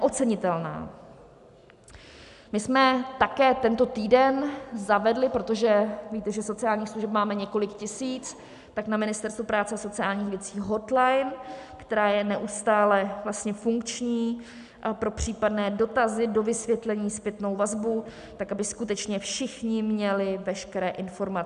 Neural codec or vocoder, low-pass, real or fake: none; 10.8 kHz; real